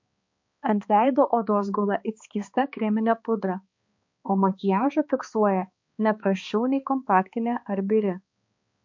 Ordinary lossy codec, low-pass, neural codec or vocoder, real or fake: MP3, 48 kbps; 7.2 kHz; codec, 16 kHz, 2 kbps, X-Codec, HuBERT features, trained on balanced general audio; fake